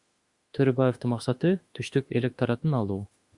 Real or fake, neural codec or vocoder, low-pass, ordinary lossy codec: fake; autoencoder, 48 kHz, 32 numbers a frame, DAC-VAE, trained on Japanese speech; 10.8 kHz; Opus, 64 kbps